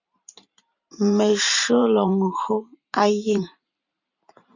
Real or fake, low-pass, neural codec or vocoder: fake; 7.2 kHz; vocoder, 44.1 kHz, 128 mel bands every 512 samples, BigVGAN v2